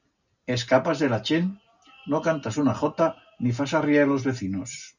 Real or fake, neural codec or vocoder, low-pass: real; none; 7.2 kHz